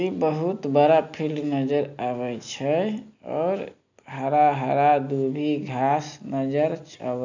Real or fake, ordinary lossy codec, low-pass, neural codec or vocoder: real; none; 7.2 kHz; none